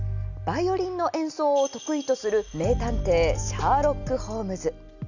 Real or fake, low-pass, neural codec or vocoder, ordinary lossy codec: real; 7.2 kHz; none; none